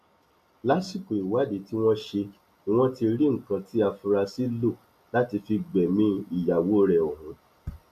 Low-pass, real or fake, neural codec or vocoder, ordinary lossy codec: 14.4 kHz; real; none; none